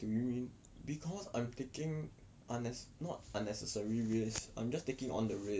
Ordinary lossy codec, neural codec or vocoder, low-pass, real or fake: none; none; none; real